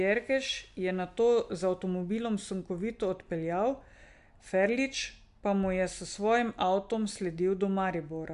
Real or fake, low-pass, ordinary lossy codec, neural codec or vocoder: real; 10.8 kHz; MP3, 64 kbps; none